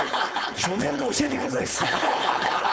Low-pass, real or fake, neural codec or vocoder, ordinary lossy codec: none; fake; codec, 16 kHz, 4.8 kbps, FACodec; none